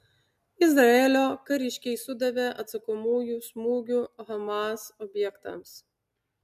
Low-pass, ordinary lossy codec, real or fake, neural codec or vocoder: 14.4 kHz; MP3, 96 kbps; real; none